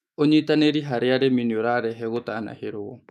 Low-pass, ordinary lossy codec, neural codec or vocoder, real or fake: 14.4 kHz; none; autoencoder, 48 kHz, 128 numbers a frame, DAC-VAE, trained on Japanese speech; fake